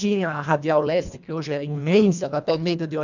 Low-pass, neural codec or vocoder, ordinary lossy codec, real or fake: 7.2 kHz; codec, 24 kHz, 1.5 kbps, HILCodec; none; fake